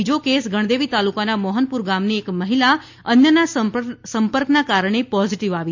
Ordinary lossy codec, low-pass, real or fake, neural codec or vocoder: MP3, 48 kbps; 7.2 kHz; real; none